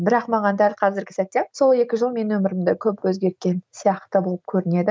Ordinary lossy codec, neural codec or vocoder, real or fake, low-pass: none; none; real; none